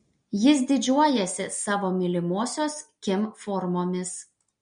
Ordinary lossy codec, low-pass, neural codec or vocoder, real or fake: MP3, 48 kbps; 9.9 kHz; none; real